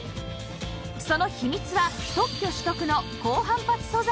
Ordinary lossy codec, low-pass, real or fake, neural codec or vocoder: none; none; real; none